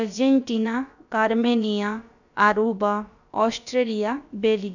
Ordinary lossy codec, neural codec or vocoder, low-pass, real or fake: none; codec, 16 kHz, about 1 kbps, DyCAST, with the encoder's durations; 7.2 kHz; fake